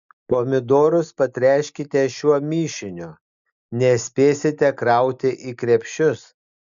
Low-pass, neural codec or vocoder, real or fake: 7.2 kHz; none; real